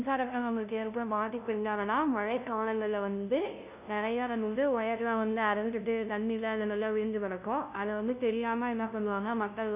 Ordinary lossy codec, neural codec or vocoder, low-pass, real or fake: none; codec, 16 kHz, 0.5 kbps, FunCodec, trained on LibriTTS, 25 frames a second; 3.6 kHz; fake